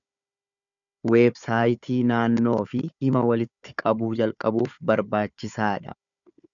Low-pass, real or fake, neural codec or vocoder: 7.2 kHz; fake; codec, 16 kHz, 4 kbps, FunCodec, trained on Chinese and English, 50 frames a second